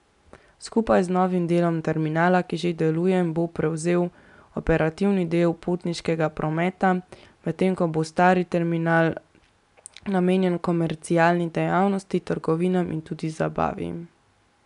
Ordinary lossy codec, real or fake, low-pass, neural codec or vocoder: MP3, 96 kbps; real; 10.8 kHz; none